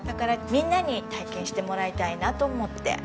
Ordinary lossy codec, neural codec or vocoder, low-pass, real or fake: none; none; none; real